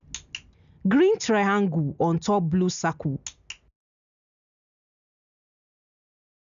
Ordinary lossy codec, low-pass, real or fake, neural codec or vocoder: none; 7.2 kHz; real; none